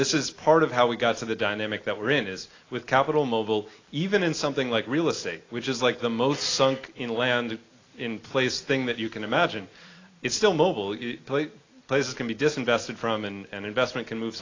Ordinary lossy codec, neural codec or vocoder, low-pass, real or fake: AAC, 32 kbps; none; 7.2 kHz; real